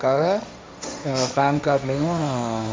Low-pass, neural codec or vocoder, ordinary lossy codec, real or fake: none; codec, 16 kHz, 1.1 kbps, Voila-Tokenizer; none; fake